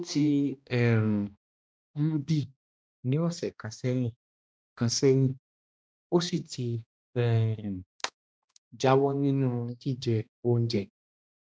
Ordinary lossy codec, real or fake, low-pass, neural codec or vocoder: none; fake; none; codec, 16 kHz, 1 kbps, X-Codec, HuBERT features, trained on balanced general audio